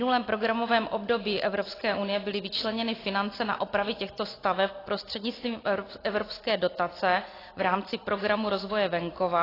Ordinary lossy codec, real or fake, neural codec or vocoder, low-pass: AAC, 24 kbps; real; none; 5.4 kHz